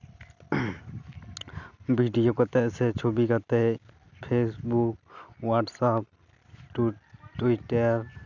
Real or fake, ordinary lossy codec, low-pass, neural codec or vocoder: real; none; 7.2 kHz; none